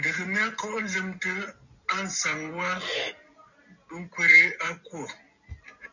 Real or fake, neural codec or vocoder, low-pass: real; none; 7.2 kHz